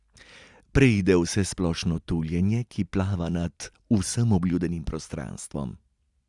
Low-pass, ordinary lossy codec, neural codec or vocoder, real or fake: 10.8 kHz; Opus, 64 kbps; none; real